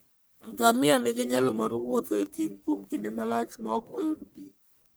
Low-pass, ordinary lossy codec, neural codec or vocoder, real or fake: none; none; codec, 44.1 kHz, 1.7 kbps, Pupu-Codec; fake